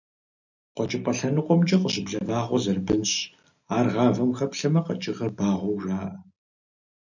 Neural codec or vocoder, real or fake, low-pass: none; real; 7.2 kHz